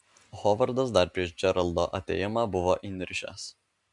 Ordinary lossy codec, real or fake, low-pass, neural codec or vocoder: MP3, 96 kbps; real; 10.8 kHz; none